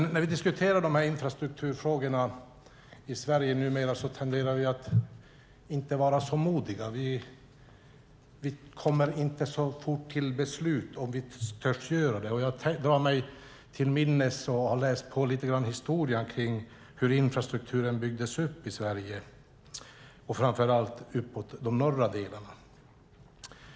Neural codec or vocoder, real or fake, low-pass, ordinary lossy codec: none; real; none; none